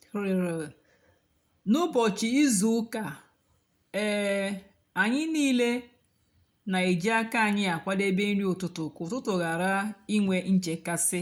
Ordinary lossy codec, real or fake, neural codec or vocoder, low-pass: none; real; none; 19.8 kHz